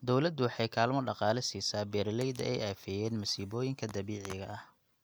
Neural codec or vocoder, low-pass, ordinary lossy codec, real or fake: none; none; none; real